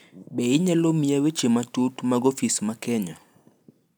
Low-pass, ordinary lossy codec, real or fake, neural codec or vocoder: none; none; real; none